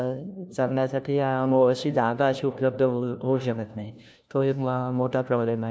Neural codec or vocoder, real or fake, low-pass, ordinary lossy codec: codec, 16 kHz, 1 kbps, FunCodec, trained on LibriTTS, 50 frames a second; fake; none; none